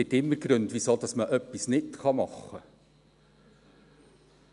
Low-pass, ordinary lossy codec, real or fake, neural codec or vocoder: 10.8 kHz; none; real; none